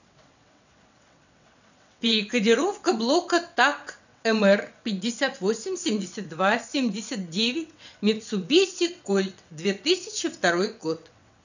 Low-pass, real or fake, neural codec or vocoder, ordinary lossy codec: 7.2 kHz; fake; vocoder, 22.05 kHz, 80 mel bands, WaveNeXt; none